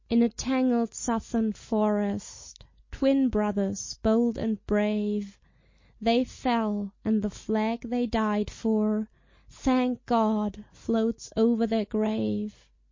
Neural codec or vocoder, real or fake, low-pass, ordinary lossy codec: none; real; 7.2 kHz; MP3, 32 kbps